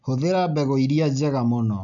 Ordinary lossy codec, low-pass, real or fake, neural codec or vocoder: none; 7.2 kHz; real; none